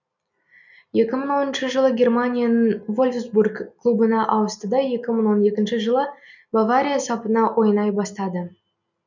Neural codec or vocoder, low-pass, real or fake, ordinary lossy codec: none; 7.2 kHz; real; none